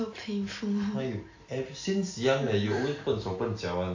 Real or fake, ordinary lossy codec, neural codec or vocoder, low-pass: real; AAC, 48 kbps; none; 7.2 kHz